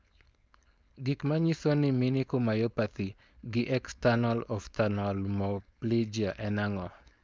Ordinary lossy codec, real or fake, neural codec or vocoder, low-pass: none; fake; codec, 16 kHz, 4.8 kbps, FACodec; none